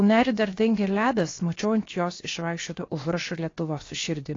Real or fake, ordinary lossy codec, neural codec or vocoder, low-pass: fake; AAC, 32 kbps; codec, 16 kHz, 0.7 kbps, FocalCodec; 7.2 kHz